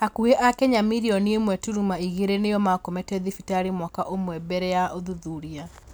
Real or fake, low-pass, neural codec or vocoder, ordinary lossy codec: real; none; none; none